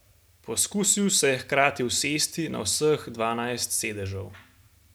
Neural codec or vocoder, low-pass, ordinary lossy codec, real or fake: none; none; none; real